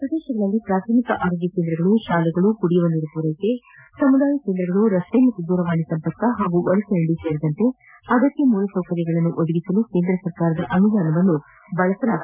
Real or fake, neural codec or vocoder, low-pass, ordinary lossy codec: real; none; 3.6 kHz; none